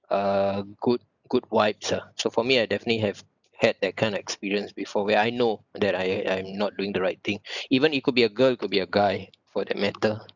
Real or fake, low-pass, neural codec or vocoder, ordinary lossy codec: real; 7.2 kHz; none; none